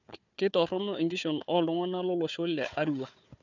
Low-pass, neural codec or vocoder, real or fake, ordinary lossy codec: 7.2 kHz; codec, 16 kHz, 4 kbps, FunCodec, trained on Chinese and English, 50 frames a second; fake; none